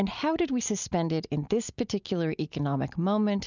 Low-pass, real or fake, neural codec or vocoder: 7.2 kHz; real; none